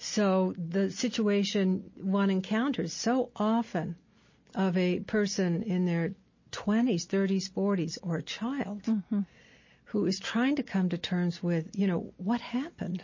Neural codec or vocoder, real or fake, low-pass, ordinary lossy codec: none; real; 7.2 kHz; MP3, 32 kbps